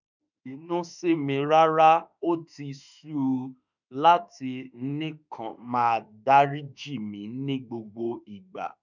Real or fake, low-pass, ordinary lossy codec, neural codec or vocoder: fake; 7.2 kHz; none; autoencoder, 48 kHz, 32 numbers a frame, DAC-VAE, trained on Japanese speech